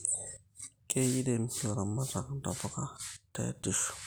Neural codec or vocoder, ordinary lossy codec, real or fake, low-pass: none; none; real; none